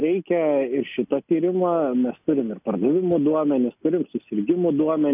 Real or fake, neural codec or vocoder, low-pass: real; none; 3.6 kHz